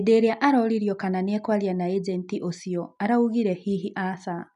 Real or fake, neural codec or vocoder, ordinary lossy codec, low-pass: real; none; none; 10.8 kHz